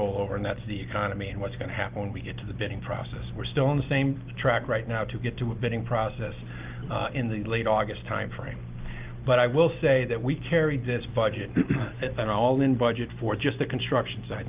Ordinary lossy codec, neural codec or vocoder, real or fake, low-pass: Opus, 24 kbps; none; real; 3.6 kHz